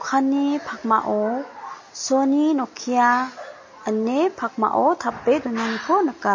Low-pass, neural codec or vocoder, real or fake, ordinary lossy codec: 7.2 kHz; none; real; MP3, 32 kbps